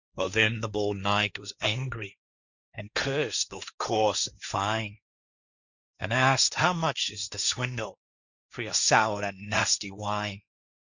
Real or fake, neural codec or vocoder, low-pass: fake; codec, 16 kHz, 1.1 kbps, Voila-Tokenizer; 7.2 kHz